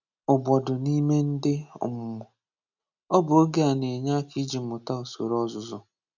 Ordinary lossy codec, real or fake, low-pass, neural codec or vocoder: none; real; 7.2 kHz; none